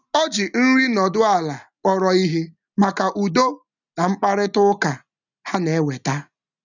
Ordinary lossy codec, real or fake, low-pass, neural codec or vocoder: none; real; 7.2 kHz; none